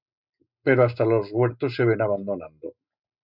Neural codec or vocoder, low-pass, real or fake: none; 5.4 kHz; real